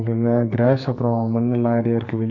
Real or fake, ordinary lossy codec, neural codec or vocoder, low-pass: fake; none; codec, 44.1 kHz, 2.6 kbps, SNAC; 7.2 kHz